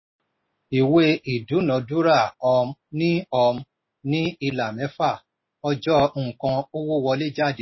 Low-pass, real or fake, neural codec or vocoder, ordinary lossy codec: 7.2 kHz; real; none; MP3, 24 kbps